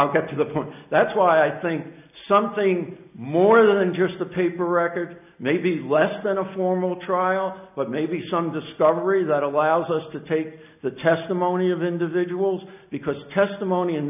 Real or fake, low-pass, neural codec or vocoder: real; 3.6 kHz; none